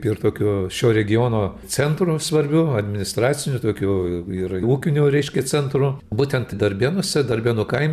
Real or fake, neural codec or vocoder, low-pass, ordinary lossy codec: fake; vocoder, 44.1 kHz, 128 mel bands every 256 samples, BigVGAN v2; 14.4 kHz; MP3, 96 kbps